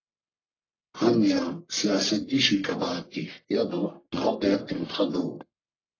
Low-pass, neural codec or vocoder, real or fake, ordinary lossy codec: 7.2 kHz; codec, 44.1 kHz, 1.7 kbps, Pupu-Codec; fake; AAC, 32 kbps